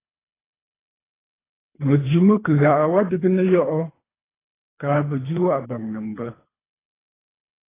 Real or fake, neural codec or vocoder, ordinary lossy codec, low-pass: fake; codec, 24 kHz, 3 kbps, HILCodec; AAC, 16 kbps; 3.6 kHz